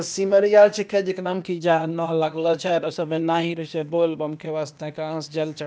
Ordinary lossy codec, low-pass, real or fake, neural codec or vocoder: none; none; fake; codec, 16 kHz, 0.8 kbps, ZipCodec